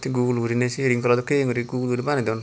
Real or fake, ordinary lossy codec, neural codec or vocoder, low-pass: real; none; none; none